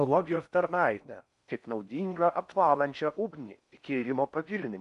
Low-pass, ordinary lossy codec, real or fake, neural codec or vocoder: 10.8 kHz; MP3, 96 kbps; fake; codec, 16 kHz in and 24 kHz out, 0.6 kbps, FocalCodec, streaming, 4096 codes